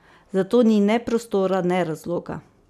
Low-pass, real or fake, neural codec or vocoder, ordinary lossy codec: 14.4 kHz; real; none; none